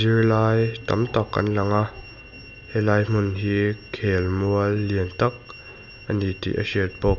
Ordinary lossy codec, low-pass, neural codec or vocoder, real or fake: none; 7.2 kHz; none; real